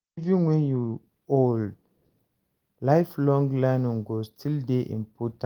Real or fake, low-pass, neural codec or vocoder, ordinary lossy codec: real; 19.8 kHz; none; Opus, 24 kbps